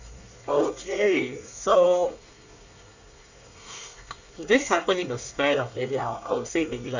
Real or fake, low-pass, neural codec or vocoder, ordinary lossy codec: fake; 7.2 kHz; codec, 24 kHz, 1 kbps, SNAC; none